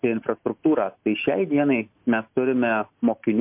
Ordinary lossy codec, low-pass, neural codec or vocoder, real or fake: MP3, 32 kbps; 3.6 kHz; none; real